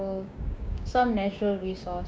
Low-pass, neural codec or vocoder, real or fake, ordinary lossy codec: none; codec, 16 kHz, 6 kbps, DAC; fake; none